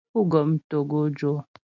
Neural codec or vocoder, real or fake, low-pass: none; real; 7.2 kHz